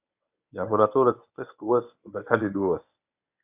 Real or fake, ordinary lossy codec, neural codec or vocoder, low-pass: fake; AAC, 32 kbps; codec, 24 kHz, 0.9 kbps, WavTokenizer, medium speech release version 1; 3.6 kHz